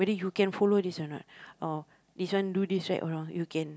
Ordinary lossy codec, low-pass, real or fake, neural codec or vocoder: none; none; real; none